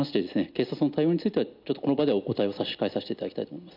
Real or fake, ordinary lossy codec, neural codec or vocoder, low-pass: real; none; none; 5.4 kHz